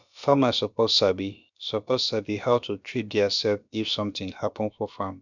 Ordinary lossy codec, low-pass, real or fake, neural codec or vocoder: none; 7.2 kHz; fake; codec, 16 kHz, about 1 kbps, DyCAST, with the encoder's durations